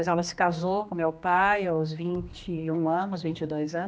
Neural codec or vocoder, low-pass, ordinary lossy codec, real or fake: codec, 16 kHz, 2 kbps, X-Codec, HuBERT features, trained on general audio; none; none; fake